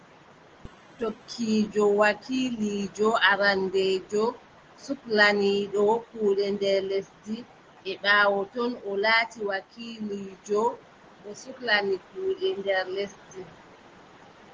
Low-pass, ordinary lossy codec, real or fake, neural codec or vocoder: 7.2 kHz; Opus, 16 kbps; real; none